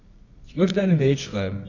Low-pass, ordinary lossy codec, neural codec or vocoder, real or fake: 7.2 kHz; none; codec, 24 kHz, 0.9 kbps, WavTokenizer, medium music audio release; fake